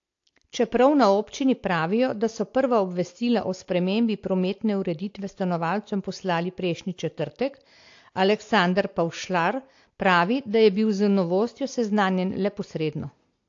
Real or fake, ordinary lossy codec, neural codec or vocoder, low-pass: real; AAC, 48 kbps; none; 7.2 kHz